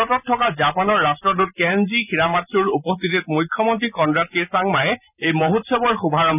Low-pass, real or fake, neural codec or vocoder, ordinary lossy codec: 3.6 kHz; real; none; none